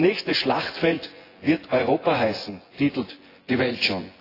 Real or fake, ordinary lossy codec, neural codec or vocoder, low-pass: fake; AAC, 24 kbps; vocoder, 24 kHz, 100 mel bands, Vocos; 5.4 kHz